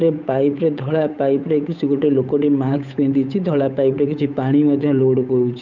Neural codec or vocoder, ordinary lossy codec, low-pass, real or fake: codec, 16 kHz, 8 kbps, FunCodec, trained on Chinese and English, 25 frames a second; none; 7.2 kHz; fake